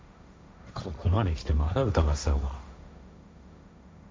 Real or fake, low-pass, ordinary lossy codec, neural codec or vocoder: fake; none; none; codec, 16 kHz, 1.1 kbps, Voila-Tokenizer